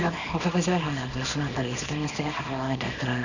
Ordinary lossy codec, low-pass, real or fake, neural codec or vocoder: none; 7.2 kHz; fake; codec, 24 kHz, 0.9 kbps, WavTokenizer, small release